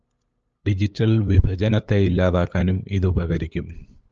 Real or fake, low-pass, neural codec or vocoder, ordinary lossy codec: fake; 7.2 kHz; codec, 16 kHz, 8 kbps, FunCodec, trained on LibriTTS, 25 frames a second; Opus, 24 kbps